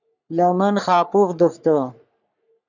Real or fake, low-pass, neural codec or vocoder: fake; 7.2 kHz; codec, 44.1 kHz, 3.4 kbps, Pupu-Codec